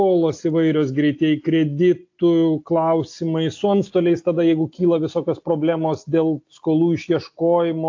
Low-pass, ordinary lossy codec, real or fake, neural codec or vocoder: 7.2 kHz; AAC, 48 kbps; real; none